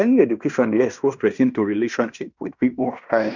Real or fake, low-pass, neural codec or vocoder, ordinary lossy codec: fake; 7.2 kHz; codec, 16 kHz in and 24 kHz out, 0.9 kbps, LongCat-Audio-Codec, fine tuned four codebook decoder; none